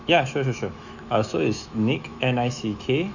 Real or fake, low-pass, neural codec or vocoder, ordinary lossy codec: fake; 7.2 kHz; autoencoder, 48 kHz, 128 numbers a frame, DAC-VAE, trained on Japanese speech; none